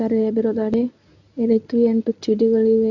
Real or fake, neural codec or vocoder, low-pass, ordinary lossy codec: fake; codec, 24 kHz, 0.9 kbps, WavTokenizer, medium speech release version 1; 7.2 kHz; none